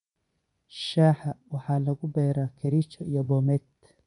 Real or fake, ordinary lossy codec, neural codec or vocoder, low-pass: fake; none; vocoder, 24 kHz, 100 mel bands, Vocos; 10.8 kHz